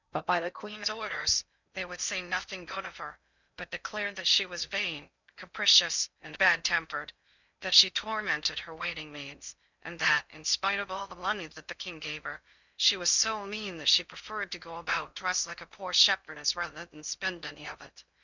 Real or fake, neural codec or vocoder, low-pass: fake; codec, 16 kHz in and 24 kHz out, 0.6 kbps, FocalCodec, streaming, 2048 codes; 7.2 kHz